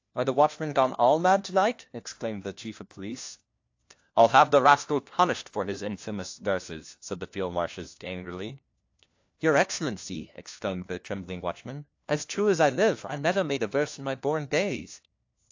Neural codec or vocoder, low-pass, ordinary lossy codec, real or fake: codec, 16 kHz, 1 kbps, FunCodec, trained on LibriTTS, 50 frames a second; 7.2 kHz; AAC, 48 kbps; fake